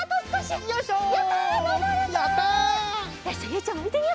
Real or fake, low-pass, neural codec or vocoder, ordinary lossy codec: real; none; none; none